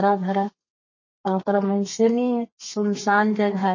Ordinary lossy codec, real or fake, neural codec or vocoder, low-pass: MP3, 32 kbps; fake; codec, 32 kHz, 1.9 kbps, SNAC; 7.2 kHz